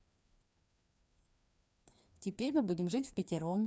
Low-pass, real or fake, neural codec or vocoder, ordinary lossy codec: none; fake; codec, 16 kHz, 2 kbps, FreqCodec, larger model; none